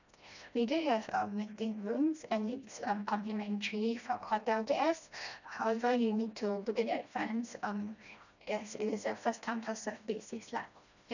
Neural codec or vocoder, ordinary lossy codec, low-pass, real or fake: codec, 16 kHz, 1 kbps, FreqCodec, smaller model; none; 7.2 kHz; fake